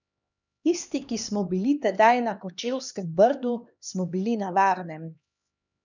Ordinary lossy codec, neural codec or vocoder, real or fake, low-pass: none; codec, 16 kHz, 2 kbps, X-Codec, HuBERT features, trained on LibriSpeech; fake; 7.2 kHz